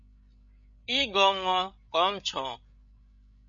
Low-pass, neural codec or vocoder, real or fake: 7.2 kHz; codec, 16 kHz, 8 kbps, FreqCodec, larger model; fake